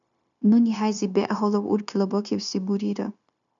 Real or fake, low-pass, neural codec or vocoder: fake; 7.2 kHz; codec, 16 kHz, 0.9 kbps, LongCat-Audio-Codec